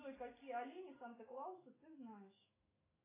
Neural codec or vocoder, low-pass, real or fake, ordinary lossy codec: autoencoder, 48 kHz, 128 numbers a frame, DAC-VAE, trained on Japanese speech; 3.6 kHz; fake; AAC, 16 kbps